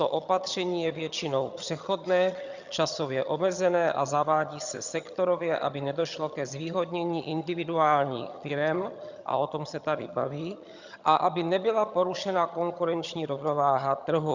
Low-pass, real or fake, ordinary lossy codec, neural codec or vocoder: 7.2 kHz; fake; Opus, 64 kbps; vocoder, 22.05 kHz, 80 mel bands, HiFi-GAN